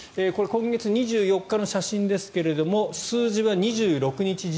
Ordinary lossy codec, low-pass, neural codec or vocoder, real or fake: none; none; none; real